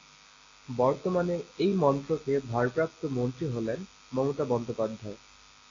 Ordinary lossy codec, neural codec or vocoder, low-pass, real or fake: AAC, 32 kbps; none; 7.2 kHz; real